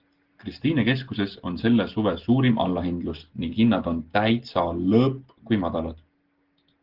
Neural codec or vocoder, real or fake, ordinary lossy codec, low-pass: none; real; Opus, 16 kbps; 5.4 kHz